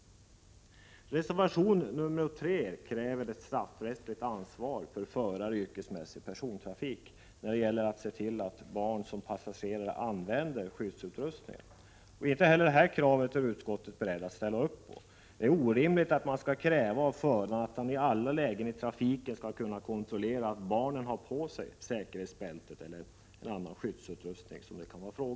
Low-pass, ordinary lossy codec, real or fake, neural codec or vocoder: none; none; real; none